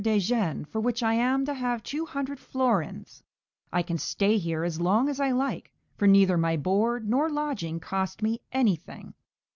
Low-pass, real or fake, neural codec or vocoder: 7.2 kHz; real; none